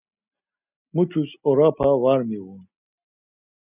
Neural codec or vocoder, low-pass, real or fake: none; 3.6 kHz; real